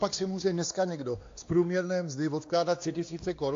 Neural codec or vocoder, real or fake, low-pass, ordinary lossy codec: codec, 16 kHz, 2 kbps, X-Codec, WavLM features, trained on Multilingual LibriSpeech; fake; 7.2 kHz; AAC, 48 kbps